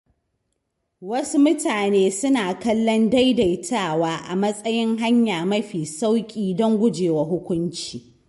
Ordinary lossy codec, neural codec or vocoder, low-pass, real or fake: MP3, 48 kbps; none; 14.4 kHz; real